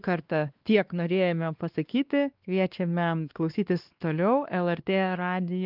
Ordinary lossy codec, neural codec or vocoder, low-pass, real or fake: Opus, 32 kbps; codec, 16 kHz, 2 kbps, X-Codec, WavLM features, trained on Multilingual LibriSpeech; 5.4 kHz; fake